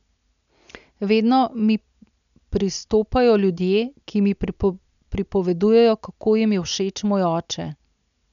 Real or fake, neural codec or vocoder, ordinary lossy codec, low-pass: real; none; none; 7.2 kHz